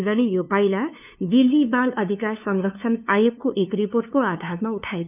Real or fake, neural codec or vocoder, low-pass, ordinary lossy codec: fake; codec, 16 kHz, 2 kbps, FunCodec, trained on LibriTTS, 25 frames a second; 3.6 kHz; none